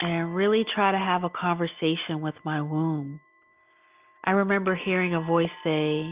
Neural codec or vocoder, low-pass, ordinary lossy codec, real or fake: none; 3.6 kHz; Opus, 24 kbps; real